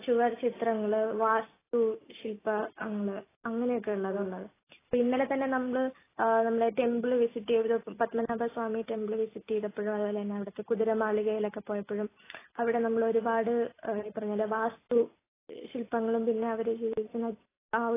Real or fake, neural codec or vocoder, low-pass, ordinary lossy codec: real; none; 3.6 kHz; AAC, 16 kbps